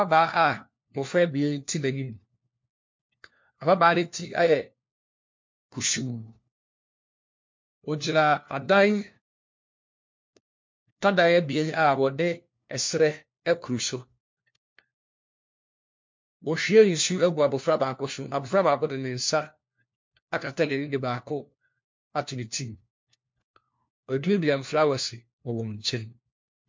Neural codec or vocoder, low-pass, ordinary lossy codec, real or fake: codec, 16 kHz, 1 kbps, FunCodec, trained on LibriTTS, 50 frames a second; 7.2 kHz; MP3, 48 kbps; fake